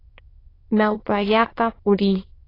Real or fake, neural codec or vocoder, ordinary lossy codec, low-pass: fake; autoencoder, 22.05 kHz, a latent of 192 numbers a frame, VITS, trained on many speakers; AAC, 24 kbps; 5.4 kHz